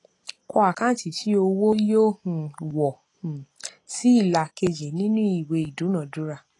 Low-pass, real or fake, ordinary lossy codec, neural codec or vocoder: 10.8 kHz; real; AAC, 32 kbps; none